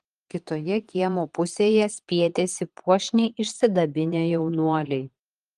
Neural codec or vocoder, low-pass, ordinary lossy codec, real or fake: vocoder, 22.05 kHz, 80 mel bands, Vocos; 9.9 kHz; Opus, 24 kbps; fake